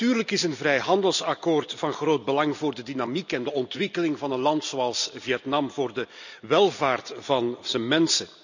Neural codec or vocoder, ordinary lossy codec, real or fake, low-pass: none; none; real; 7.2 kHz